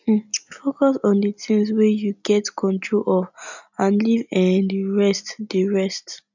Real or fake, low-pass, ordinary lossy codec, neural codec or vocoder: real; 7.2 kHz; none; none